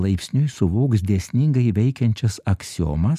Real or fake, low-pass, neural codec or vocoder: real; 14.4 kHz; none